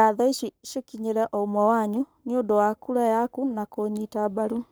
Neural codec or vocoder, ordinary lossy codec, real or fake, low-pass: codec, 44.1 kHz, 7.8 kbps, Pupu-Codec; none; fake; none